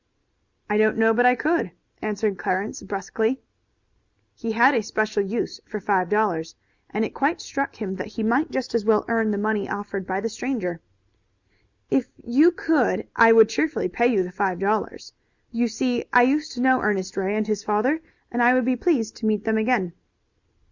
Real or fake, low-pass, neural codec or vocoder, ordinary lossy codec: real; 7.2 kHz; none; Opus, 64 kbps